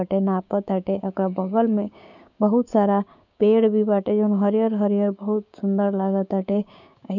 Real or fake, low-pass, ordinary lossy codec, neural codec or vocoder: fake; 7.2 kHz; none; codec, 16 kHz, 6 kbps, DAC